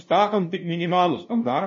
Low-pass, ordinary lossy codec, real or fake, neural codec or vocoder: 7.2 kHz; MP3, 32 kbps; fake; codec, 16 kHz, 0.5 kbps, FunCodec, trained on LibriTTS, 25 frames a second